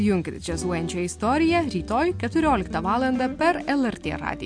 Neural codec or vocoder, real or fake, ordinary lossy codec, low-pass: none; real; MP3, 64 kbps; 9.9 kHz